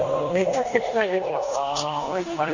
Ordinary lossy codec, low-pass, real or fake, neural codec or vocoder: none; 7.2 kHz; fake; codec, 16 kHz in and 24 kHz out, 0.9 kbps, LongCat-Audio-Codec, four codebook decoder